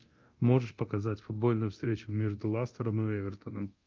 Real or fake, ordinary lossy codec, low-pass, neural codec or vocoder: fake; Opus, 24 kbps; 7.2 kHz; codec, 24 kHz, 0.9 kbps, DualCodec